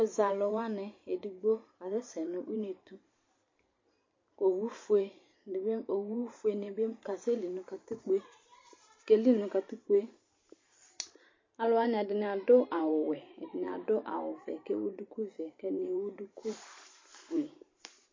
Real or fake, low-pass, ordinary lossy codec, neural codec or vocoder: fake; 7.2 kHz; MP3, 32 kbps; vocoder, 44.1 kHz, 128 mel bands every 512 samples, BigVGAN v2